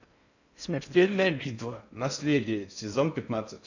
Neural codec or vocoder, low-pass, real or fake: codec, 16 kHz in and 24 kHz out, 0.6 kbps, FocalCodec, streaming, 4096 codes; 7.2 kHz; fake